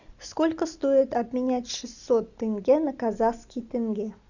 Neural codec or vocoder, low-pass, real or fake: none; 7.2 kHz; real